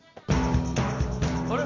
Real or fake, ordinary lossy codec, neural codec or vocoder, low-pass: real; MP3, 64 kbps; none; 7.2 kHz